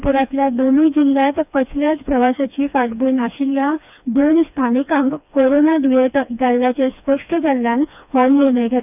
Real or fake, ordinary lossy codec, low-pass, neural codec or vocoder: fake; none; 3.6 kHz; codec, 16 kHz, 2 kbps, FreqCodec, smaller model